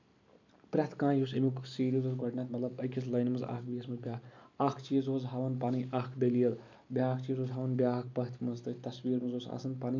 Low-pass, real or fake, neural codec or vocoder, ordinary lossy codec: 7.2 kHz; real; none; MP3, 64 kbps